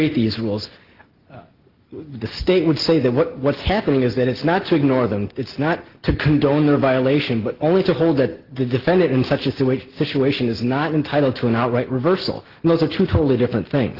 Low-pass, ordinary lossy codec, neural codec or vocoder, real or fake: 5.4 kHz; Opus, 16 kbps; none; real